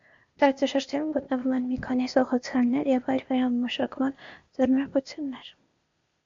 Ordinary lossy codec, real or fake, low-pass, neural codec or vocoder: MP3, 48 kbps; fake; 7.2 kHz; codec, 16 kHz, 0.8 kbps, ZipCodec